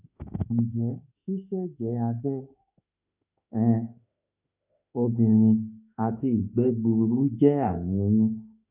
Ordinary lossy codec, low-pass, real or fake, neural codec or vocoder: none; 3.6 kHz; fake; autoencoder, 48 kHz, 32 numbers a frame, DAC-VAE, trained on Japanese speech